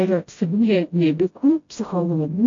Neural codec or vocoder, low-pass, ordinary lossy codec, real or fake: codec, 16 kHz, 0.5 kbps, FreqCodec, smaller model; 7.2 kHz; AAC, 48 kbps; fake